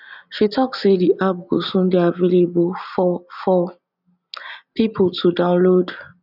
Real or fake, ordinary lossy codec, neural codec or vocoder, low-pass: real; none; none; 5.4 kHz